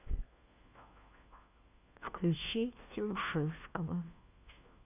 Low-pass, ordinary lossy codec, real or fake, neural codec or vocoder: 3.6 kHz; none; fake; codec, 16 kHz, 1 kbps, FunCodec, trained on LibriTTS, 50 frames a second